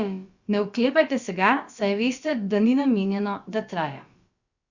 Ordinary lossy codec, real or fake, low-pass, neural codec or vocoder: Opus, 64 kbps; fake; 7.2 kHz; codec, 16 kHz, about 1 kbps, DyCAST, with the encoder's durations